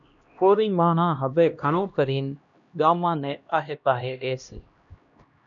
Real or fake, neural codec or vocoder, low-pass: fake; codec, 16 kHz, 1 kbps, X-Codec, HuBERT features, trained on LibriSpeech; 7.2 kHz